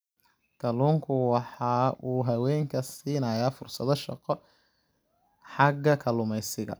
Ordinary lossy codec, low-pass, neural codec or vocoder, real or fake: none; none; none; real